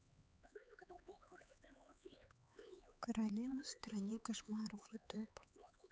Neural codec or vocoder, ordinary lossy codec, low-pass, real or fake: codec, 16 kHz, 4 kbps, X-Codec, HuBERT features, trained on LibriSpeech; none; none; fake